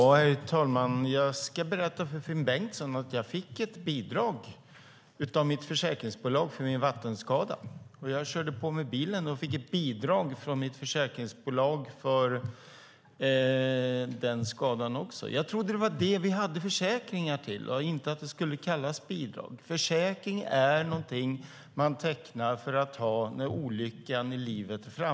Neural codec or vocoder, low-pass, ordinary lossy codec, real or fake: none; none; none; real